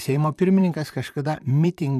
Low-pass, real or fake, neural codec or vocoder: 14.4 kHz; fake; vocoder, 44.1 kHz, 128 mel bands, Pupu-Vocoder